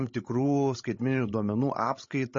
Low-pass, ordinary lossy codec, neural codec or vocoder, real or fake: 7.2 kHz; MP3, 32 kbps; codec, 16 kHz, 16 kbps, FreqCodec, larger model; fake